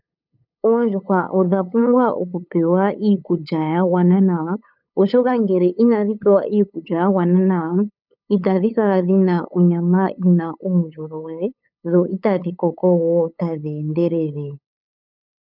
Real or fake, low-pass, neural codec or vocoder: fake; 5.4 kHz; codec, 16 kHz, 8 kbps, FunCodec, trained on LibriTTS, 25 frames a second